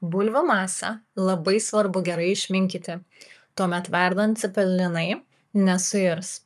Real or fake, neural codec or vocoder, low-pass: fake; codec, 44.1 kHz, 7.8 kbps, Pupu-Codec; 14.4 kHz